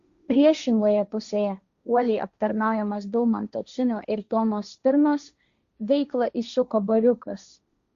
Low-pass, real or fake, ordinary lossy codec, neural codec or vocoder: 7.2 kHz; fake; Opus, 64 kbps; codec, 16 kHz, 1.1 kbps, Voila-Tokenizer